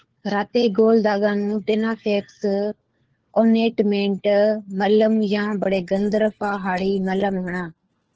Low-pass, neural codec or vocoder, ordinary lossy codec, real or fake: 7.2 kHz; codec, 16 kHz, 4 kbps, FunCodec, trained on LibriTTS, 50 frames a second; Opus, 16 kbps; fake